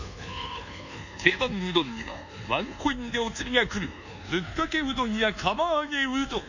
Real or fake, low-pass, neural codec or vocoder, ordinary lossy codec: fake; 7.2 kHz; codec, 24 kHz, 1.2 kbps, DualCodec; none